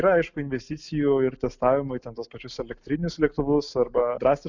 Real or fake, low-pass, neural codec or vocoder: real; 7.2 kHz; none